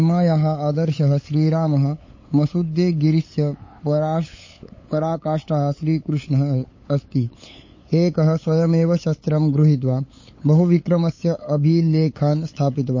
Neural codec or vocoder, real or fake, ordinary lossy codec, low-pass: codec, 16 kHz, 8 kbps, FunCodec, trained on LibriTTS, 25 frames a second; fake; MP3, 32 kbps; 7.2 kHz